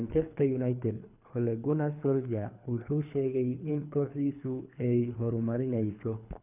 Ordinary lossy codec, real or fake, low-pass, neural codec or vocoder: none; fake; 3.6 kHz; codec, 24 kHz, 3 kbps, HILCodec